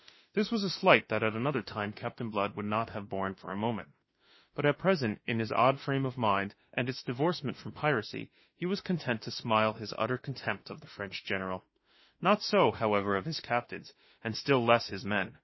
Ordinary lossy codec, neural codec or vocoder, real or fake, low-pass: MP3, 24 kbps; autoencoder, 48 kHz, 32 numbers a frame, DAC-VAE, trained on Japanese speech; fake; 7.2 kHz